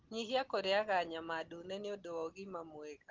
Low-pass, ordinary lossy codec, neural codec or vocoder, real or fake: 7.2 kHz; Opus, 16 kbps; none; real